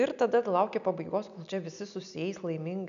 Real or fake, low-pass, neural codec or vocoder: real; 7.2 kHz; none